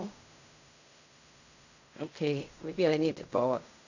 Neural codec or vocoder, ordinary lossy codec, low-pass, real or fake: codec, 16 kHz in and 24 kHz out, 0.4 kbps, LongCat-Audio-Codec, fine tuned four codebook decoder; none; 7.2 kHz; fake